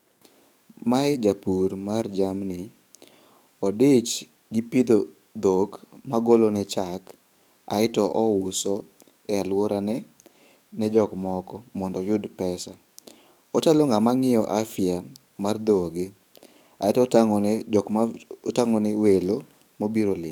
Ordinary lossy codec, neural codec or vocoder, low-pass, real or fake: none; codec, 44.1 kHz, 7.8 kbps, DAC; 19.8 kHz; fake